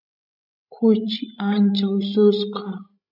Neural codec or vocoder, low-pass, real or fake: codec, 16 kHz, 16 kbps, FreqCodec, larger model; 5.4 kHz; fake